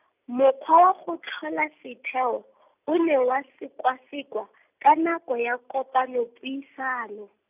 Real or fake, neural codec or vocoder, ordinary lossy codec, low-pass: real; none; none; 3.6 kHz